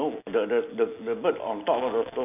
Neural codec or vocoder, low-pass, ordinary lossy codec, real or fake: none; 3.6 kHz; none; real